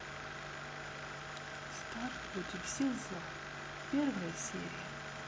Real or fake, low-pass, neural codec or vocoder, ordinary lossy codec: real; none; none; none